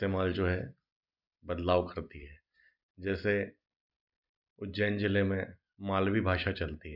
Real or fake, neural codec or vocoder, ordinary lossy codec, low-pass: real; none; none; 5.4 kHz